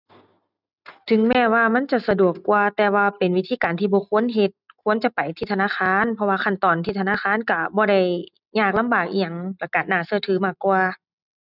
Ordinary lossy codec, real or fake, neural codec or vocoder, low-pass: none; real; none; 5.4 kHz